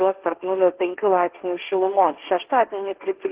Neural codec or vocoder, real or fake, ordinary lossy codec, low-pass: codec, 16 kHz, 1.1 kbps, Voila-Tokenizer; fake; Opus, 16 kbps; 3.6 kHz